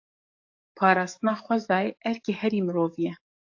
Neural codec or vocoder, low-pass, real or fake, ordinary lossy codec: codec, 44.1 kHz, 7.8 kbps, DAC; 7.2 kHz; fake; AAC, 48 kbps